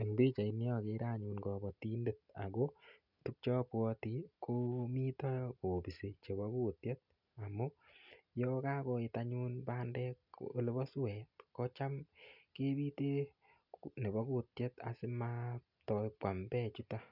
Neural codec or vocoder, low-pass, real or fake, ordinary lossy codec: none; 5.4 kHz; real; none